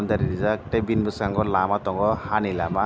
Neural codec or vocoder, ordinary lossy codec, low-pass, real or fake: none; none; none; real